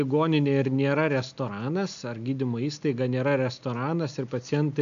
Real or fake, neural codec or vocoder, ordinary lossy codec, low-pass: real; none; MP3, 96 kbps; 7.2 kHz